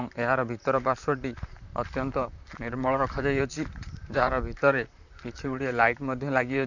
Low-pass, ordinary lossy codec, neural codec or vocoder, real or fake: 7.2 kHz; none; vocoder, 44.1 kHz, 128 mel bands, Pupu-Vocoder; fake